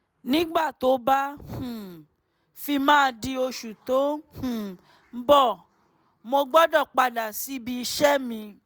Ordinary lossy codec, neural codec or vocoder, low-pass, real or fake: none; none; none; real